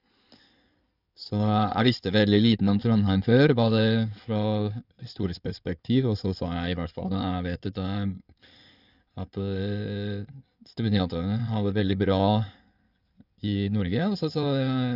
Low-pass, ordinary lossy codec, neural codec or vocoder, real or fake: 5.4 kHz; none; codec, 16 kHz in and 24 kHz out, 2.2 kbps, FireRedTTS-2 codec; fake